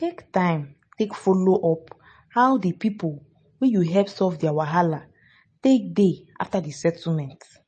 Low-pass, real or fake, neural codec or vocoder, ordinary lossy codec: 9.9 kHz; real; none; MP3, 32 kbps